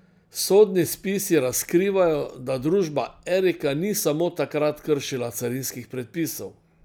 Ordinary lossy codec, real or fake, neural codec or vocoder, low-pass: none; real; none; none